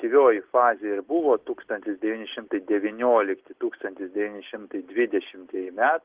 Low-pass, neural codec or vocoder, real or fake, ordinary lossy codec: 3.6 kHz; none; real; Opus, 16 kbps